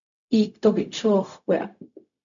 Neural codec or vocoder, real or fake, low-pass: codec, 16 kHz, 0.4 kbps, LongCat-Audio-Codec; fake; 7.2 kHz